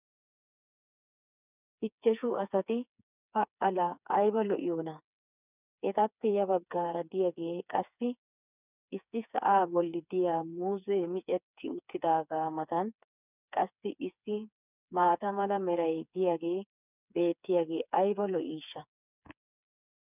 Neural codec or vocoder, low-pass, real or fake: codec, 16 kHz, 4 kbps, FreqCodec, smaller model; 3.6 kHz; fake